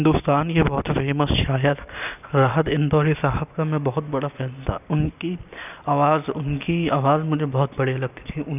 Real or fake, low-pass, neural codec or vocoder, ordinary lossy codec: real; 3.6 kHz; none; none